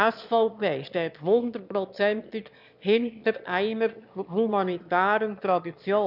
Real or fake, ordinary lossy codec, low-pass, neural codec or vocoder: fake; none; 5.4 kHz; autoencoder, 22.05 kHz, a latent of 192 numbers a frame, VITS, trained on one speaker